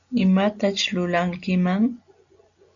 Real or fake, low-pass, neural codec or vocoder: real; 7.2 kHz; none